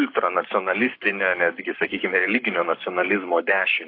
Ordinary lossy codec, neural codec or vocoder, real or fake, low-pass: AAC, 32 kbps; codec, 44.1 kHz, 7.8 kbps, Pupu-Codec; fake; 5.4 kHz